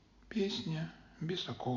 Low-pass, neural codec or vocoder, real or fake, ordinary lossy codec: 7.2 kHz; none; real; none